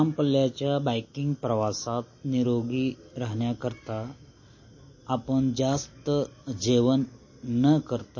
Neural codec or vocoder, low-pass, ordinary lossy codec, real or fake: none; 7.2 kHz; MP3, 32 kbps; real